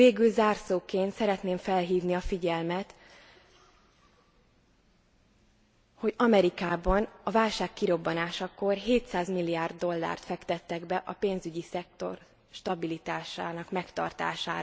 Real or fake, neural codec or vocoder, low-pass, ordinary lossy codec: real; none; none; none